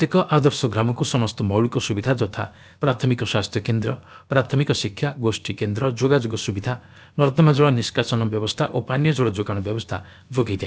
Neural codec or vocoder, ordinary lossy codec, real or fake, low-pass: codec, 16 kHz, about 1 kbps, DyCAST, with the encoder's durations; none; fake; none